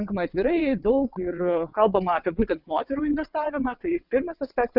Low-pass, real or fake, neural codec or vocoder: 5.4 kHz; fake; vocoder, 22.05 kHz, 80 mel bands, WaveNeXt